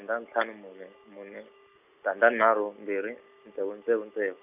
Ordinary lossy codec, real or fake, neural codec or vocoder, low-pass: none; real; none; 3.6 kHz